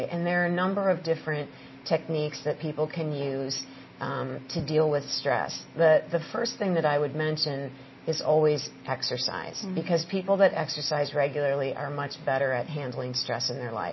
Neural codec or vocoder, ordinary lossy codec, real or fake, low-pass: none; MP3, 24 kbps; real; 7.2 kHz